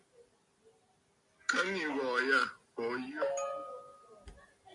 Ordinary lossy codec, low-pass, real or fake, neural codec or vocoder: MP3, 48 kbps; 10.8 kHz; real; none